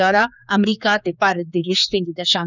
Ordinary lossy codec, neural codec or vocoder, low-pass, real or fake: none; codec, 16 kHz, 4 kbps, X-Codec, HuBERT features, trained on general audio; 7.2 kHz; fake